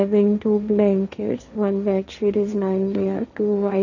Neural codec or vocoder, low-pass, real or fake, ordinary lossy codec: codec, 16 kHz, 1.1 kbps, Voila-Tokenizer; 7.2 kHz; fake; none